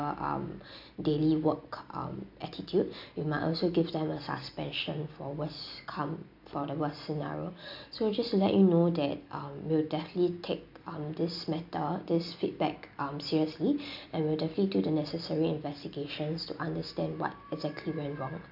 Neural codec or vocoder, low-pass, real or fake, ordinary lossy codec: none; 5.4 kHz; real; MP3, 48 kbps